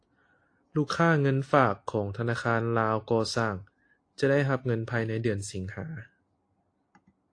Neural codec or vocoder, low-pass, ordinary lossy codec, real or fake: none; 9.9 kHz; AAC, 48 kbps; real